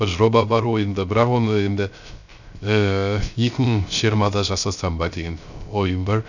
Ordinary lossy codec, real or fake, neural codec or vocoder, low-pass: none; fake; codec, 16 kHz, 0.3 kbps, FocalCodec; 7.2 kHz